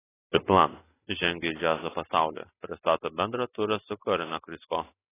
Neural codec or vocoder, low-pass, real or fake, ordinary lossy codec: none; 3.6 kHz; real; AAC, 16 kbps